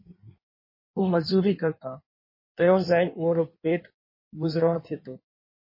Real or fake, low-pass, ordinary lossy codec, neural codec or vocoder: fake; 5.4 kHz; MP3, 24 kbps; codec, 16 kHz in and 24 kHz out, 1.1 kbps, FireRedTTS-2 codec